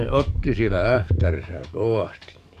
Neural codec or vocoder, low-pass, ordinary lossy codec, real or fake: codec, 44.1 kHz, 7.8 kbps, DAC; 14.4 kHz; none; fake